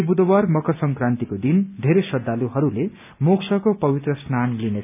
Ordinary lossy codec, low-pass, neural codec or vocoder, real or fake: none; 3.6 kHz; none; real